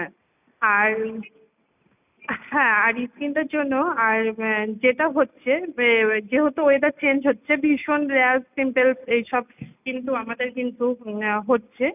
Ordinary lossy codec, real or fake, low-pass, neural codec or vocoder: none; real; 3.6 kHz; none